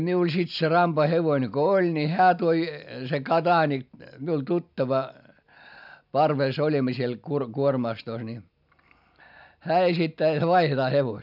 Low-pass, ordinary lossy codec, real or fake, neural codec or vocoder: 5.4 kHz; none; real; none